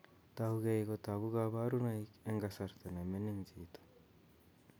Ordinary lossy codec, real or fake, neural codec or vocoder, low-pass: none; real; none; none